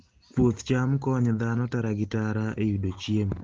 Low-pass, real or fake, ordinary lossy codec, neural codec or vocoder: 7.2 kHz; real; Opus, 16 kbps; none